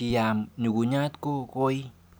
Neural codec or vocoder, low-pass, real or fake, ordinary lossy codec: none; none; real; none